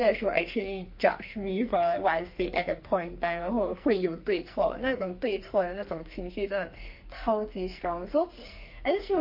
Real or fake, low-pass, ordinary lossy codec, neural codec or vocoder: fake; 5.4 kHz; MP3, 32 kbps; codec, 44.1 kHz, 2.6 kbps, SNAC